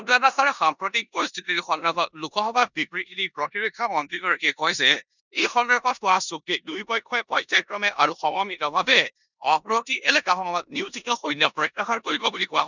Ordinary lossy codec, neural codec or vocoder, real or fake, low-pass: none; codec, 16 kHz in and 24 kHz out, 0.9 kbps, LongCat-Audio-Codec, four codebook decoder; fake; 7.2 kHz